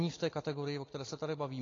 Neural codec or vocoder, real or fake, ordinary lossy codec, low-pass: none; real; AAC, 32 kbps; 7.2 kHz